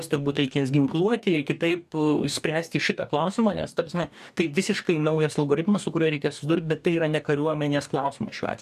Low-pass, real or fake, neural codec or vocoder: 14.4 kHz; fake; codec, 44.1 kHz, 2.6 kbps, DAC